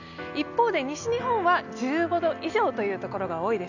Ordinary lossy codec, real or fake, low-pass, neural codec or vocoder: none; real; 7.2 kHz; none